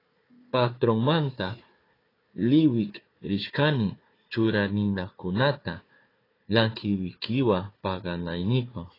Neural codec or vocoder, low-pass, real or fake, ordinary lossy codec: codec, 16 kHz, 4 kbps, FunCodec, trained on Chinese and English, 50 frames a second; 5.4 kHz; fake; AAC, 24 kbps